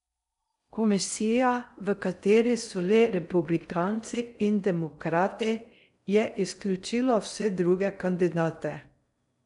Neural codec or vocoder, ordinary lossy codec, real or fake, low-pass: codec, 16 kHz in and 24 kHz out, 0.6 kbps, FocalCodec, streaming, 4096 codes; none; fake; 10.8 kHz